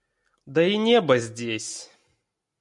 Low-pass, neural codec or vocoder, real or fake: 10.8 kHz; none; real